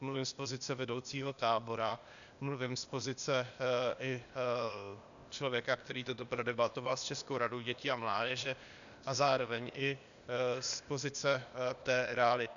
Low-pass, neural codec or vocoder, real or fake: 7.2 kHz; codec, 16 kHz, 0.8 kbps, ZipCodec; fake